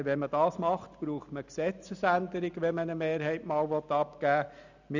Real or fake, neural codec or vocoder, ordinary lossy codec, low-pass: real; none; none; 7.2 kHz